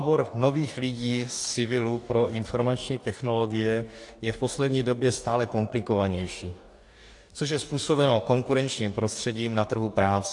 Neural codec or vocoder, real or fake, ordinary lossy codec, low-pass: codec, 44.1 kHz, 2.6 kbps, DAC; fake; AAC, 64 kbps; 10.8 kHz